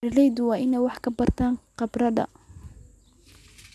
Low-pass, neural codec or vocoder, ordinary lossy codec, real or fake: none; none; none; real